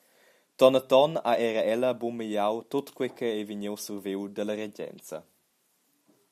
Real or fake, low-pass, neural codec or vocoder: real; 14.4 kHz; none